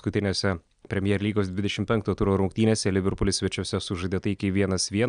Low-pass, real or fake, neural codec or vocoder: 9.9 kHz; real; none